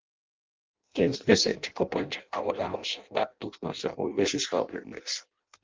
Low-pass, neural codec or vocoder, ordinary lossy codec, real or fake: 7.2 kHz; codec, 16 kHz in and 24 kHz out, 0.6 kbps, FireRedTTS-2 codec; Opus, 32 kbps; fake